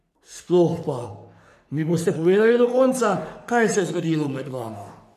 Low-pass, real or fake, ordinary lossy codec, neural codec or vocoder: 14.4 kHz; fake; none; codec, 44.1 kHz, 3.4 kbps, Pupu-Codec